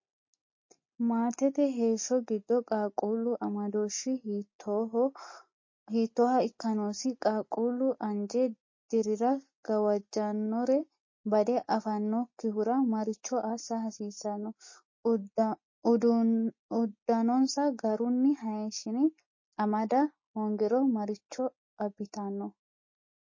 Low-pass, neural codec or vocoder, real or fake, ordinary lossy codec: 7.2 kHz; none; real; MP3, 32 kbps